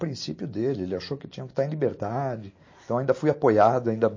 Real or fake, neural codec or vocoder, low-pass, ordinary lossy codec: real; none; 7.2 kHz; MP3, 32 kbps